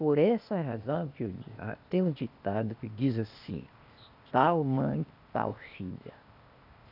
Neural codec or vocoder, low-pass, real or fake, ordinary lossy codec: codec, 16 kHz, 0.8 kbps, ZipCodec; 5.4 kHz; fake; none